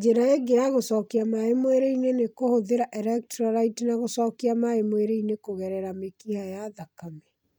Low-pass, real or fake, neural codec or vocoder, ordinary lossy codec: none; real; none; none